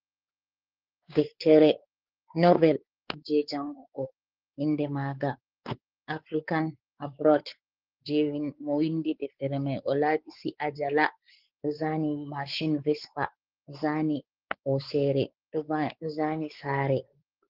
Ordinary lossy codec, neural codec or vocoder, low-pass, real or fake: Opus, 16 kbps; codec, 16 kHz, 4 kbps, X-Codec, WavLM features, trained on Multilingual LibriSpeech; 5.4 kHz; fake